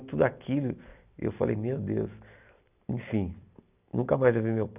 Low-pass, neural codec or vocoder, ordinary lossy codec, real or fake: 3.6 kHz; none; none; real